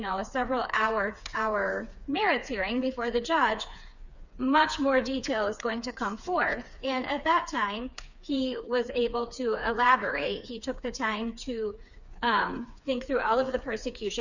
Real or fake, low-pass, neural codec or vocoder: fake; 7.2 kHz; codec, 16 kHz, 4 kbps, FreqCodec, smaller model